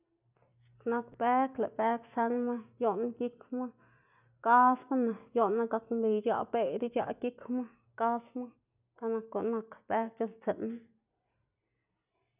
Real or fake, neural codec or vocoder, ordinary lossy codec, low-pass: real; none; none; 3.6 kHz